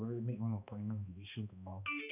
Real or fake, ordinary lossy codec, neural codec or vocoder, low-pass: fake; none; codec, 16 kHz, 2 kbps, X-Codec, HuBERT features, trained on balanced general audio; 3.6 kHz